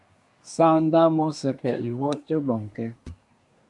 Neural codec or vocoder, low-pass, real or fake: codec, 24 kHz, 1 kbps, SNAC; 10.8 kHz; fake